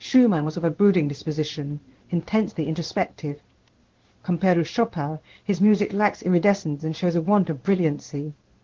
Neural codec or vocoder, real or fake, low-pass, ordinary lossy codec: codec, 16 kHz in and 24 kHz out, 1 kbps, XY-Tokenizer; fake; 7.2 kHz; Opus, 16 kbps